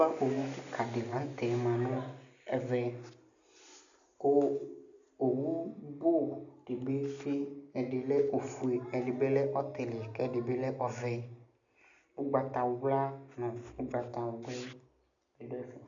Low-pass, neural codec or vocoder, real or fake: 7.2 kHz; none; real